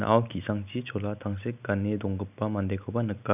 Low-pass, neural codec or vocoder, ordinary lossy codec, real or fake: 3.6 kHz; none; none; real